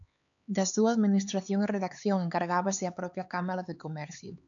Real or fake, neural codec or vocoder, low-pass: fake; codec, 16 kHz, 4 kbps, X-Codec, HuBERT features, trained on LibriSpeech; 7.2 kHz